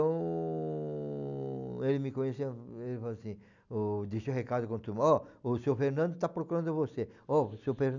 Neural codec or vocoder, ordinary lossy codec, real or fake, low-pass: none; none; real; 7.2 kHz